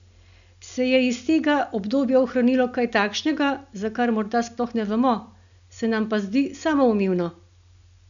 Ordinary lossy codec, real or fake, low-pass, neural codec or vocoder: none; real; 7.2 kHz; none